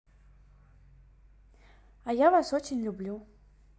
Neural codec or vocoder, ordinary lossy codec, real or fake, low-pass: none; none; real; none